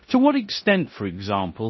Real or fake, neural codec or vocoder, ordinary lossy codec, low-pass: fake; codec, 16 kHz in and 24 kHz out, 0.9 kbps, LongCat-Audio-Codec, four codebook decoder; MP3, 24 kbps; 7.2 kHz